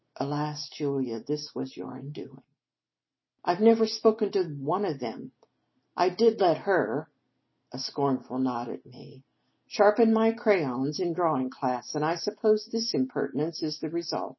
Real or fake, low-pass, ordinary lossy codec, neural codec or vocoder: real; 7.2 kHz; MP3, 24 kbps; none